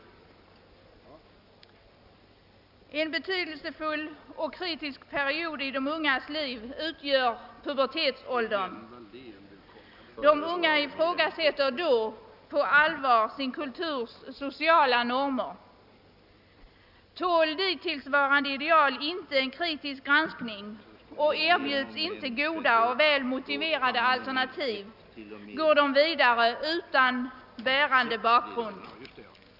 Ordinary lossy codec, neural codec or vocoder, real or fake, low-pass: none; none; real; 5.4 kHz